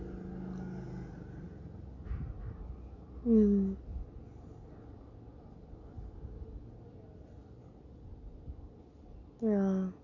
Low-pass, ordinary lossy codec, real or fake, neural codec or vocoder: 7.2 kHz; none; real; none